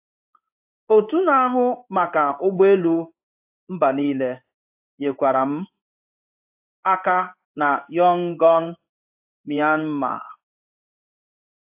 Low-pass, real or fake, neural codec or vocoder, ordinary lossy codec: 3.6 kHz; fake; codec, 16 kHz in and 24 kHz out, 1 kbps, XY-Tokenizer; AAC, 32 kbps